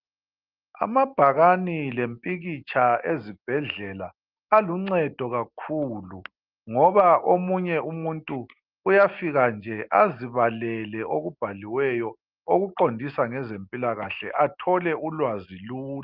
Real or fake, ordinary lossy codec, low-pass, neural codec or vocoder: real; Opus, 32 kbps; 5.4 kHz; none